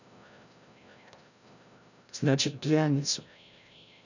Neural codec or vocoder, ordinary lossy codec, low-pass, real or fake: codec, 16 kHz, 0.5 kbps, FreqCodec, larger model; none; 7.2 kHz; fake